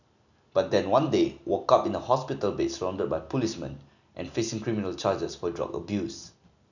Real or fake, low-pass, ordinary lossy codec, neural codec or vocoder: real; 7.2 kHz; none; none